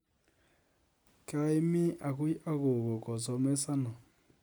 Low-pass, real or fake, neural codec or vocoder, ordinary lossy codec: none; real; none; none